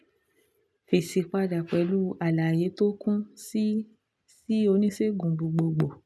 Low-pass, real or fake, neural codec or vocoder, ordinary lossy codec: none; real; none; none